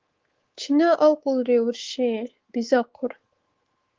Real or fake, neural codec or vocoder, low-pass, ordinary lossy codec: fake; codec, 24 kHz, 3.1 kbps, DualCodec; 7.2 kHz; Opus, 16 kbps